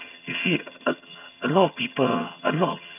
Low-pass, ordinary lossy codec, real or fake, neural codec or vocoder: 3.6 kHz; none; fake; vocoder, 22.05 kHz, 80 mel bands, HiFi-GAN